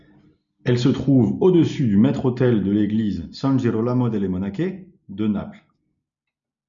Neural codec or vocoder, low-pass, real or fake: none; 7.2 kHz; real